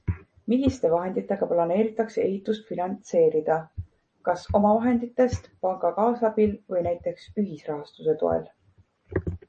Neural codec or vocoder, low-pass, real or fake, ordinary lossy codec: none; 10.8 kHz; real; MP3, 32 kbps